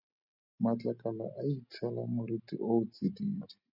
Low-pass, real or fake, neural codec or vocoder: 5.4 kHz; real; none